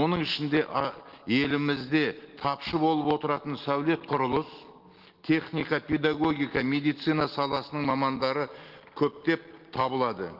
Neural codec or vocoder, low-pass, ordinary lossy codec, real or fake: none; 5.4 kHz; Opus, 16 kbps; real